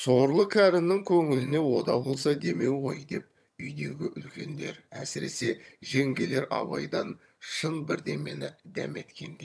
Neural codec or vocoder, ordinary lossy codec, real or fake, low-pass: vocoder, 22.05 kHz, 80 mel bands, HiFi-GAN; none; fake; none